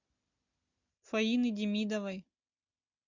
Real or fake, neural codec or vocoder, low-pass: real; none; 7.2 kHz